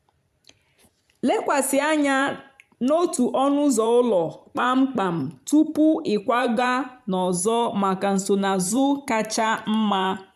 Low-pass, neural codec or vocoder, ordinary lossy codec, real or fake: 14.4 kHz; vocoder, 44.1 kHz, 128 mel bands every 512 samples, BigVGAN v2; none; fake